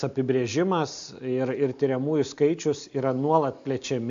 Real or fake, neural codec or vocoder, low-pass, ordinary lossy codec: real; none; 7.2 kHz; AAC, 64 kbps